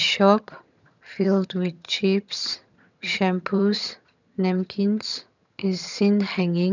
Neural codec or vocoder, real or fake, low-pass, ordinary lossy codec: vocoder, 22.05 kHz, 80 mel bands, HiFi-GAN; fake; 7.2 kHz; none